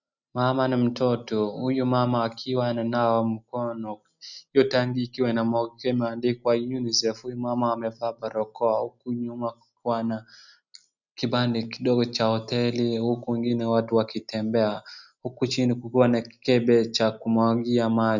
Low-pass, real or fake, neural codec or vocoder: 7.2 kHz; real; none